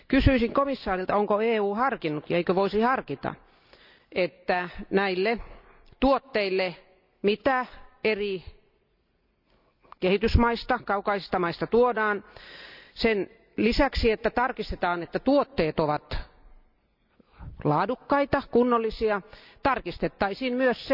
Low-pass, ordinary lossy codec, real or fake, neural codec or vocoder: 5.4 kHz; none; real; none